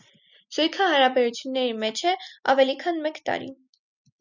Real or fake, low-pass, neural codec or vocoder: real; 7.2 kHz; none